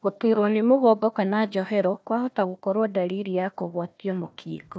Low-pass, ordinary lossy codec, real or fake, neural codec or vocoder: none; none; fake; codec, 16 kHz, 1 kbps, FunCodec, trained on Chinese and English, 50 frames a second